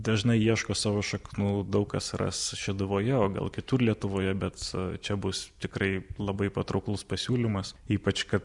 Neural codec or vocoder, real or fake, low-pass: none; real; 10.8 kHz